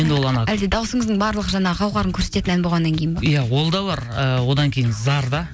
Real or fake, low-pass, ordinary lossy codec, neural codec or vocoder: real; none; none; none